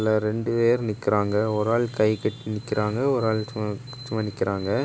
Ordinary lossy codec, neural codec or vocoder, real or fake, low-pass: none; none; real; none